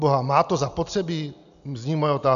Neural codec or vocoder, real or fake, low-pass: none; real; 7.2 kHz